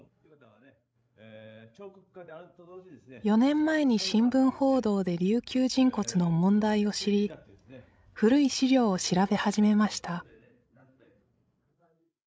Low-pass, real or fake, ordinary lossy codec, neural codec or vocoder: none; fake; none; codec, 16 kHz, 16 kbps, FreqCodec, larger model